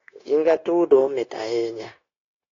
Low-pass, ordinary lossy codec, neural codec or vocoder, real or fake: 7.2 kHz; AAC, 32 kbps; codec, 16 kHz, 0.9 kbps, LongCat-Audio-Codec; fake